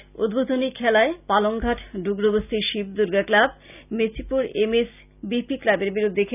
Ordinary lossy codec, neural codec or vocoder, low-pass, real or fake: none; none; 3.6 kHz; real